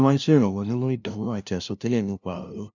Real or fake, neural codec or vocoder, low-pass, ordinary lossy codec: fake; codec, 16 kHz, 0.5 kbps, FunCodec, trained on LibriTTS, 25 frames a second; 7.2 kHz; none